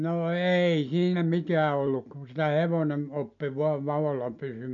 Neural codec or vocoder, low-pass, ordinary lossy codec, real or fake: none; 7.2 kHz; MP3, 64 kbps; real